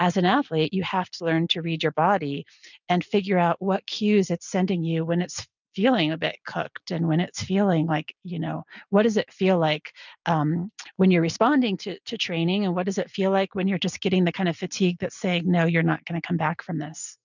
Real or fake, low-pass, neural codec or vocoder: real; 7.2 kHz; none